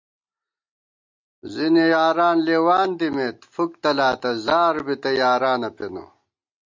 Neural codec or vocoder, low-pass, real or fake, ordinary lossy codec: none; 7.2 kHz; real; MP3, 48 kbps